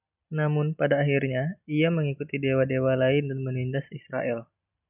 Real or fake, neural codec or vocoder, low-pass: real; none; 3.6 kHz